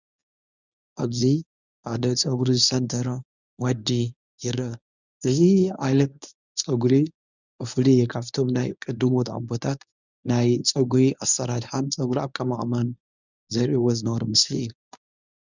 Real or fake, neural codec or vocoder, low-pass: fake; codec, 24 kHz, 0.9 kbps, WavTokenizer, medium speech release version 1; 7.2 kHz